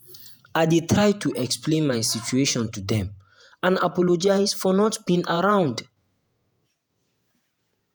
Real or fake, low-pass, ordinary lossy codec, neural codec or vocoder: fake; none; none; vocoder, 48 kHz, 128 mel bands, Vocos